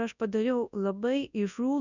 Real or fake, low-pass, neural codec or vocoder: fake; 7.2 kHz; codec, 24 kHz, 0.9 kbps, WavTokenizer, large speech release